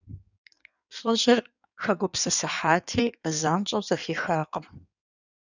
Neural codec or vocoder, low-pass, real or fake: codec, 16 kHz in and 24 kHz out, 1.1 kbps, FireRedTTS-2 codec; 7.2 kHz; fake